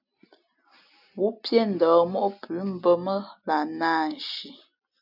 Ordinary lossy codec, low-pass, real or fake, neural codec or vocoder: AAC, 48 kbps; 5.4 kHz; real; none